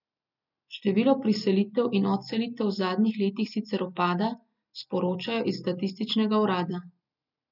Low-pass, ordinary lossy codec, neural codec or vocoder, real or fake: 5.4 kHz; none; none; real